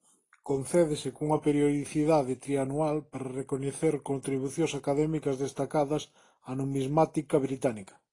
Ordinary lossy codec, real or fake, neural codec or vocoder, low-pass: AAC, 32 kbps; real; none; 10.8 kHz